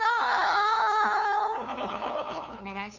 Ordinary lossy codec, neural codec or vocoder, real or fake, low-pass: none; codec, 16 kHz, 2 kbps, FunCodec, trained on LibriTTS, 25 frames a second; fake; 7.2 kHz